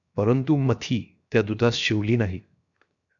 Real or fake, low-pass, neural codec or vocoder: fake; 7.2 kHz; codec, 16 kHz, 0.7 kbps, FocalCodec